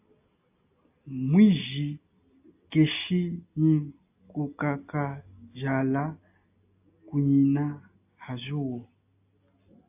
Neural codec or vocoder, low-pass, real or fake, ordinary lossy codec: none; 3.6 kHz; real; AAC, 32 kbps